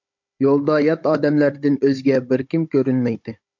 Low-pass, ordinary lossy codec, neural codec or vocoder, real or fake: 7.2 kHz; MP3, 48 kbps; codec, 16 kHz, 16 kbps, FunCodec, trained on Chinese and English, 50 frames a second; fake